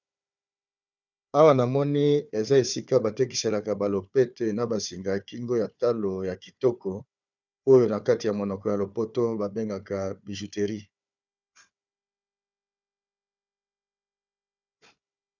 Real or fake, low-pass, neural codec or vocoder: fake; 7.2 kHz; codec, 16 kHz, 4 kbps, FunCodec, trained on Chinese and English, 50 frames a second